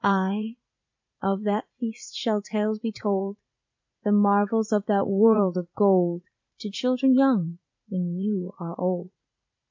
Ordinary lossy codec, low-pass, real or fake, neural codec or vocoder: MP3, 64 kbps; 7.2 kHz; fake; vocoder, 44.1 kHz, 80 mel bands, Vocos